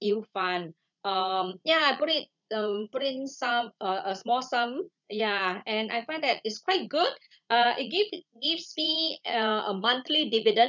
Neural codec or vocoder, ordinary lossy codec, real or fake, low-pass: vocoder, 44.1 kHz, 80 mel bands, Vocos; none; fake; 7.2 kHz